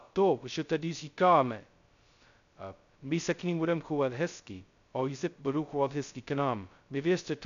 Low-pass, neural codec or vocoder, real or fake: 7.2 kHz; codec, 16 kHz, 0.2 kbps, FocalCodec; fake